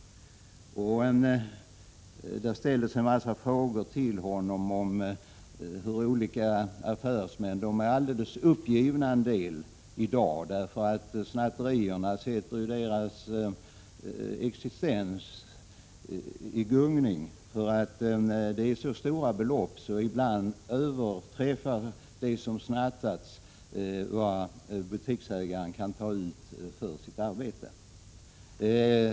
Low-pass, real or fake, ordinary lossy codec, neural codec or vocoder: none; real; none; none